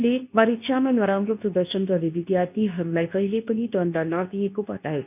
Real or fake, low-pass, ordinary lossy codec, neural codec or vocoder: fake; 3.6 kHz; none; codec, 24 kHz, 0.9 kbps, WavTokenizer, medium speech release version 2